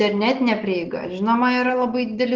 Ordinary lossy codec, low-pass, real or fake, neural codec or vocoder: Opus, 16 kbps; 7.2 kHz; real; none